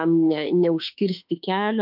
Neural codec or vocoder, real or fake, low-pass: autoencoder, 48 kHz, 32 numbers a frame, DAC-VAE, trained on Japanese speech; fake; 5.4 kHz